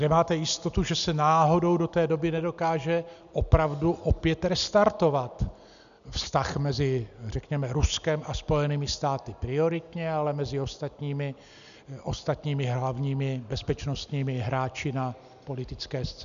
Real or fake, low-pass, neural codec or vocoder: real; 7.2 kHz; none